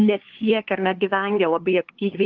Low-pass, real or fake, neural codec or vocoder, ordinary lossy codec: 7.2 kHz; fake; codec, 16 kHz, 2 kbps, FunCodec, trained on LibriTTS, 25 frames a second; Opus, 16 kbps